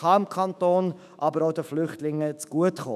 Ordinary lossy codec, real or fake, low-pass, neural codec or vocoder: none; fake; 14.4 kHz; autoencoder, 48 kHz, 128 numbers a frame, DAC-VAE, trained on Japanese speech